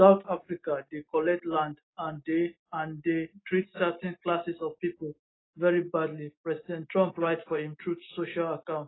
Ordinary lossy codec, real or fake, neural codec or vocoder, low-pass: AAC, 16 kbps; real; none; 7.2 kHz